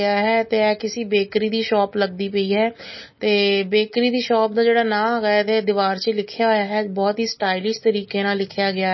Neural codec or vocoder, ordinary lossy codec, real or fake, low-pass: none; MP3, 24 kbps; real; 7.2 kHz